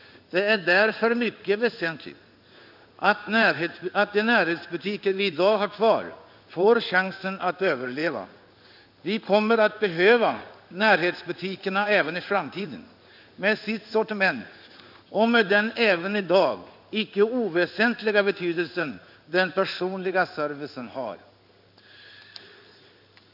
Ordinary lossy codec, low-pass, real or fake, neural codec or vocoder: none; 5.4 kHz; fake; codec, 16 kHz in and 24 kHz out, 1 kbps, XY-Tokenizer